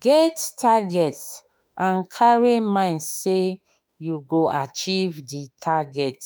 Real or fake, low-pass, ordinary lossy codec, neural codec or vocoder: fake; none; none; autoencoder, 48 kHz, 32 numbers a frame, DAC-VAE, trained on Japanese speech